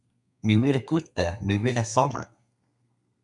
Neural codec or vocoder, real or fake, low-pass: codec, 32 kHz, 1.9 kbps, SNAC; fake; 10.8 kHz